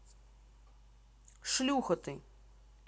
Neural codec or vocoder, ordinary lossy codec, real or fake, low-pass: none; none; real; none